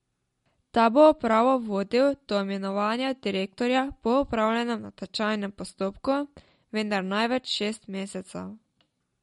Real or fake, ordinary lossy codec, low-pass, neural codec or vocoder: real; MP3, 48 kbps; 14.4 kHz; none